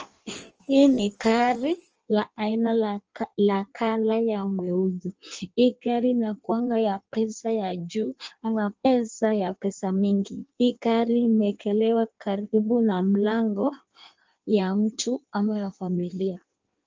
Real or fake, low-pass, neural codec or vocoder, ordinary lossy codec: fake; 7.2 kHz; codec, 16 kHz in and 24 kHz out, 1.1 kbps, FireRedTTS-2 codec; Opus, 24 kbps